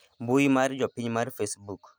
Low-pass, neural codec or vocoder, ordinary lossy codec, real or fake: none; none; none; real